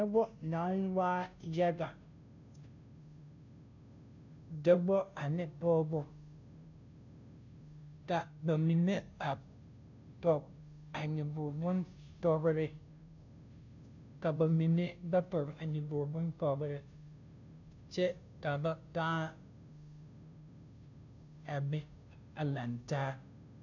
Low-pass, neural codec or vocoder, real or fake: 7.2 kHz; codec, 16 kHz, 0.5 kbps, FunCodec, trained on Chinese and English, 25 frames a second; fake